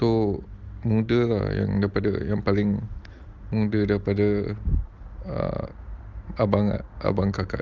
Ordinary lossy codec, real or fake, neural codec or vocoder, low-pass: Opus, 32 kbps; real; none; 7.2 kHz